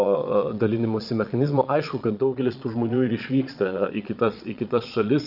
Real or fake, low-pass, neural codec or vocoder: fake; 5.4 kHz; vocoder, 22.05 kHz, 80 mel bands, Vocos